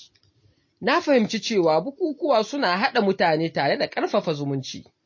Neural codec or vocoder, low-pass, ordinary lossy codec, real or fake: none; 7.2 kHz; MP3, 32 kbps; real